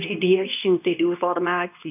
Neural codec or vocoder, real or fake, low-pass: codec, 24 kHz, 0.9 kbps, WavTokenizer, medium speech release version 2; fake; 3.6 kHz